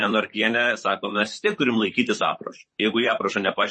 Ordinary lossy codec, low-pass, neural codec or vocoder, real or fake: MP3, 32 kbps; 10.8 kHz; vocoder, 44.1 kHz, 128 mel bands, Pupu-Vocoder; fake